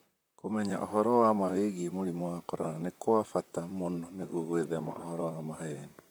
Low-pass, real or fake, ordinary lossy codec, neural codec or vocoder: none; fake; none; vocoder, 44.1 kHz, 128 mel bands, Pupu-Vocoder